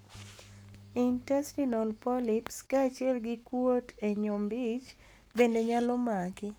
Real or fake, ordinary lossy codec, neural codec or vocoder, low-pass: fake; none; codec, 44.1 kHz, 7.8 kbps, Pupu-Codec; none